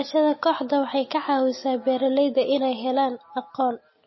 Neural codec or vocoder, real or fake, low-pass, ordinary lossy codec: none; real; 7.2 kHz; MP3, 24 kbps